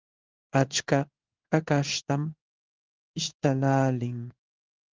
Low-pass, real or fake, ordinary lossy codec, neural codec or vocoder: 7.2 kHz; fake; Opus, 24 kbps; codec, 16 kHz in and 24 kHz out, 1 kbps, XY-Tokenizer